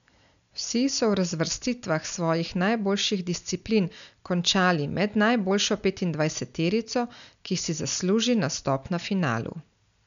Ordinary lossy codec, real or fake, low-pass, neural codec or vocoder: none; real; 7.2 kHz; none